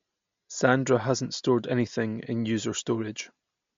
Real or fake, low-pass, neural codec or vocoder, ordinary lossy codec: real; 7.2 kHz; none; MP3, 48 kbps